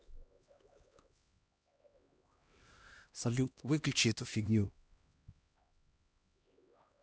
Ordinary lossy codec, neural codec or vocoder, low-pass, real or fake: none; codec, 16 kHz, 1 kbps, X-Codec, HuBERT features, trained on LibriSpeech; none; fake